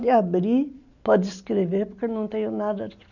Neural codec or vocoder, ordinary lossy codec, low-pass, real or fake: none; none; 7.2 kHz; real